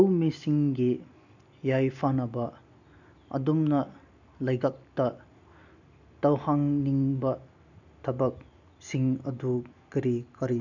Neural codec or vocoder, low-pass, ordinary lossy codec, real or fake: none; 7.2 kHz; none; real